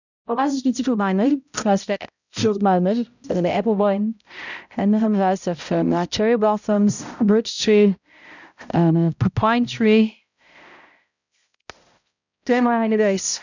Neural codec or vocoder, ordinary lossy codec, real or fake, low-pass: codec, 16 kHz, 0.5 kbps, X-Codec, HuBERT features, trained on balanced general audio; none; fake; 7.2 kHz